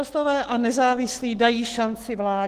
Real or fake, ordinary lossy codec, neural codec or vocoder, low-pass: fake; Opus, 16 kbps; autoencoder, 48 kHz, 128 numbers a frame, DAC-VAE, trained on Japanese speech; 14.4 kHz